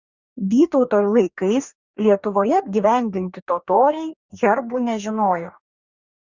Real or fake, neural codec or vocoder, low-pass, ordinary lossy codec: fake; codec, 44.1 kHz, 2.6 kbps, DAC; 7.2 kHz; Opus, 64 kbps